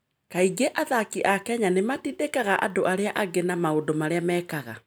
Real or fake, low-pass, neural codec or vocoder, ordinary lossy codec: real; none; none; none